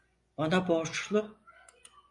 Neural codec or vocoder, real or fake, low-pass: vocoder, 24 kHz, 100 mel bands, Vocos; fake; 10.8 kHz